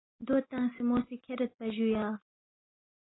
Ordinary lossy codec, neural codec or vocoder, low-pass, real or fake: AAC, 16 kbps; none; 7.2 kHz; real